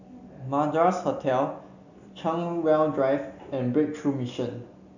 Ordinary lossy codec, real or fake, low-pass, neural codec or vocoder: none; real; 7.2 kHz; none